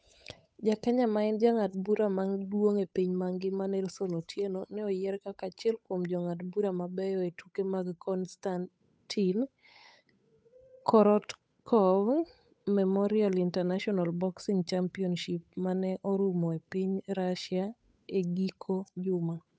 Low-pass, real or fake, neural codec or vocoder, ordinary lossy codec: none; fake; codec, 16 kHz, 8 kbps, FunCodec, trained on Chinese and English, 25 frames a second; none